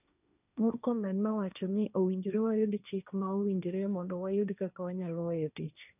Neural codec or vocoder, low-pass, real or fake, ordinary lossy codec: codec, 16 kHz, 1.1 kbps, Voila-Tokenizer; 3.6 kHz; fake; none